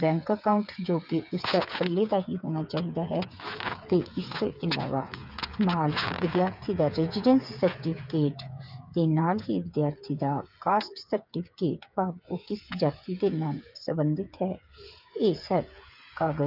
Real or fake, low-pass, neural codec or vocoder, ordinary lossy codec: fake; 5.4 kHz; codec, 16 kHz, 8 kbps, FreqCodec, smaller model; none